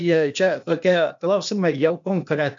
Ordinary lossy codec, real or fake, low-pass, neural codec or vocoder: MP3, 64 kbps; fake; 7.2 kHz; codec, 16 kHz, 0.8 kbps, ZipCodec